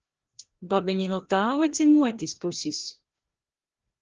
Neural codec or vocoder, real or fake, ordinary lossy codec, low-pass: codec, 16 kHz, 1 kbps, FreqCodec, larger model; fake; Opus, 16 kbps; 7.2 kHz